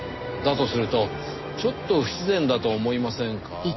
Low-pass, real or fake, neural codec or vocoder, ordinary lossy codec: 7.2 kHz; real; none; MP3, 24 kbps